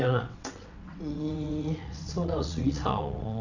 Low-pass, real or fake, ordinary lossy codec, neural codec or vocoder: 7.2 kHz; fake; none; vocoder, 22.05 kHz, 80 mel bands, WaveNeXt